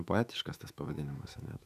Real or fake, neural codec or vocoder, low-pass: fake; autoencoder, 48 kHz, 128 numbers a frame, DAC-VAE, trained on Japanese speech; 14.4 kHz